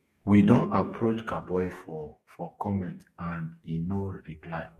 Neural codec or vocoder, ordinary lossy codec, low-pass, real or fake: codec, 44.1 kHz, 2.6 kbps, DAC; MP3, 64 kbps; 14.4 kHz; fake